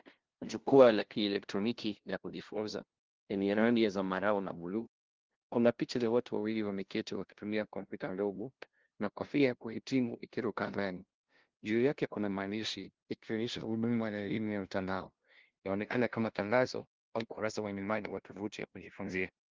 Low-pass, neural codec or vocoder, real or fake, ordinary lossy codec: 7.2 kHz; codec, 16 kHz, 0.5 kbps, FunCodec, trained on LibriTTS, 25 frames a second; fake; Opus, 16 kbps